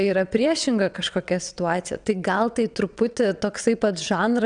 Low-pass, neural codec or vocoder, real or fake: 9.9 kHz; vocoder, 22.05 kHz, 80 mel bands, WaveNeXt; fake